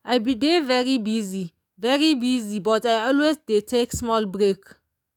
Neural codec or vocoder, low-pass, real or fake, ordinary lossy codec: codec, 44.1 kHz, 7.8 kbps, DAC; 19.8 kHz; fake; none